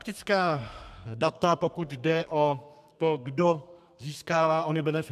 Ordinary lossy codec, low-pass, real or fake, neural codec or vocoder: MP3, 96 kbps; 14.4 kHz; fake; codec, 32 kHz, 1.9 kbps, SNAC